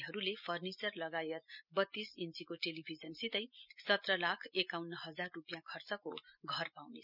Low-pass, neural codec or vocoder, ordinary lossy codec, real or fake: 5.4 kHz; none; none; real